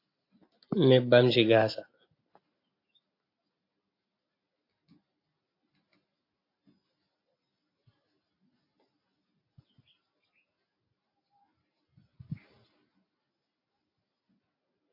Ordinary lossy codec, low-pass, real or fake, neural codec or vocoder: AAC, 32 kbps; 5.4 kHz; real; none